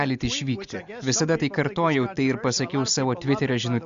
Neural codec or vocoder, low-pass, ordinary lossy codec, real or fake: none; 7.2 kHz; Opus, 64 kbps; real